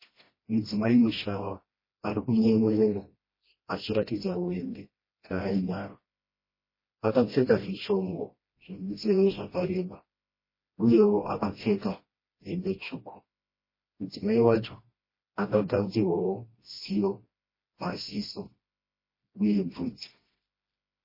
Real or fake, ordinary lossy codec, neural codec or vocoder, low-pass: fake; MP3, 24 kbps; codec, 16 kHz, 1 kbps, FreqCodec, smaller model; 5.4 kHz